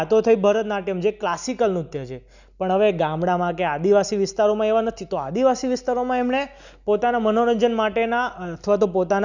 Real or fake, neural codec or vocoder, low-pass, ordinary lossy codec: real; none; 7.2 kHz; none